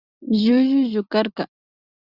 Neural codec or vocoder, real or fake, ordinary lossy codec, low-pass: none; real; Opus, 64 kbps; 5.4 kHz